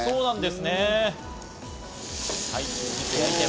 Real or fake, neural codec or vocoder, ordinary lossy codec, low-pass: real; none; none; none